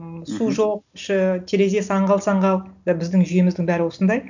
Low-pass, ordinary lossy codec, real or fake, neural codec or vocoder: 7.2 kHz; none; real; none